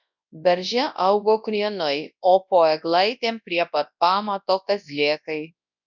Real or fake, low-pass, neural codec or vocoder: fake; 7.2 kHz; codec, 24 kHz, 0.9 kbps, WavTokenizer, large speech release